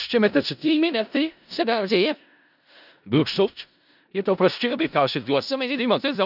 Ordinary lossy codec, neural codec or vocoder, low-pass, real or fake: none; codec, 16 kHz in and 24 kHz out, 0.4 kbps, LongCat-Audio-Codec, four codebook decoder; 5.4 kHz; fake